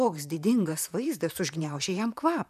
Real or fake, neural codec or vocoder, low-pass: fake; vocoder, 44.1 kHz, 128 mel bands, Pupu-Vocoder; 14.4 kHz